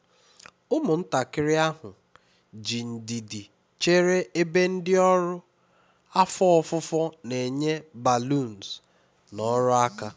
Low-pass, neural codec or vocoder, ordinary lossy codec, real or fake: none; none; none; real